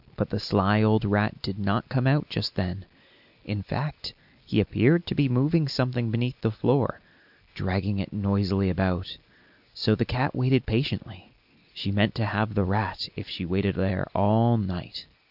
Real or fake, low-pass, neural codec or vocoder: real; 5.4 kHz; none